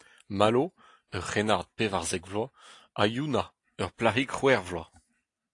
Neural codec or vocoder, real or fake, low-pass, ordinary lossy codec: none; real; 10.8 kHz; AAC, 48 kbps